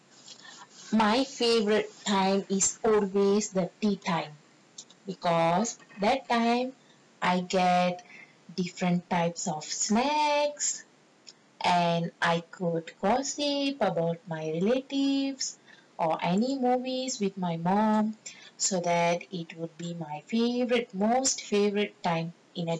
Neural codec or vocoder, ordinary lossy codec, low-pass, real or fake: none; none; 9.9 kHz; real